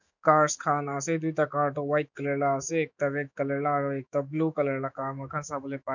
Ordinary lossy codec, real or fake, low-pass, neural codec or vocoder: none; fake; 7.2 kHz; autoencoder, 48 kHz, 128 numbers a frame, DAC-VAE, trained on Japanese speech